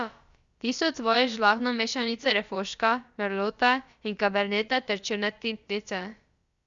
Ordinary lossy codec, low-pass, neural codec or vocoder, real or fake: Opus, 64 kbps; 7.2 kHz; codec, 16 kHz, about 1 kbps, DyCAST, with the encoder's durations; fake